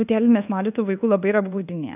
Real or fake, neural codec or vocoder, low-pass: fake; codec, 24 kHz, 1.2 kbps, DualCodec; 3.6 kHz